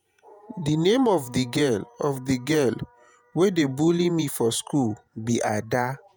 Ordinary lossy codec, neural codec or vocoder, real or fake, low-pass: none; vocoder, 48 kHz, 128 mel bands, Vocos; fake; none